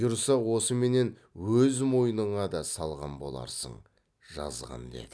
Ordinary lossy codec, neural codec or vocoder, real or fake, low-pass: none; none; real; none